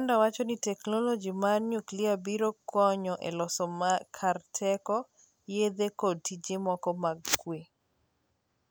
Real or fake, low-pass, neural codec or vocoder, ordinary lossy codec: real; none; none; none